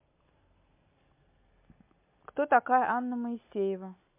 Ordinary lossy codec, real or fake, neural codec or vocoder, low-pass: MP3, 32 kbps; real; none; 3.6 kHz